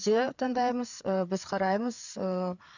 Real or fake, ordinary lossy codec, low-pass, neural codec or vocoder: fake; none; 7.2 kHz; codec, 16 kHz, 4 kbps, FreqCodec, larger model